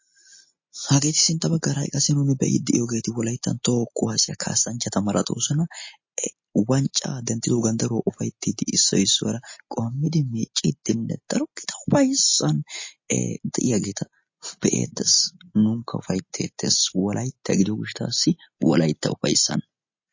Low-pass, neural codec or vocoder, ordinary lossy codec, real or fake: 7.2 kHz; none; MP3, 32 kbps; real